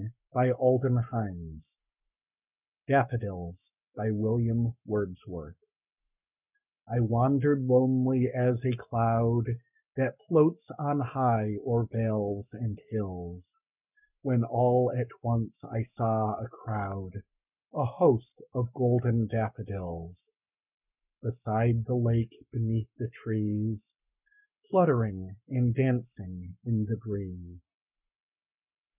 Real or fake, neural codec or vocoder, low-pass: fake; codec, 44.1 kHz, 7.8 kbps, Pupu-Codec; 3.6 kHz